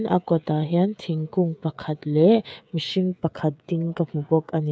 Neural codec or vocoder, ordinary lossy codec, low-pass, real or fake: codec, 16 kHz, 16 kbps, FreqCodec, smaller model; none; none; fake